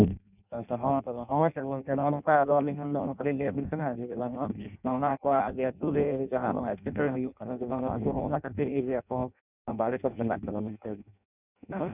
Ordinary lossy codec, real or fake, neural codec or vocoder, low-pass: none; fake; codec, 16 kHz in and 24 kHz out, 0.6 kbps, FireRedTTS-2 codec; 3.6 kHz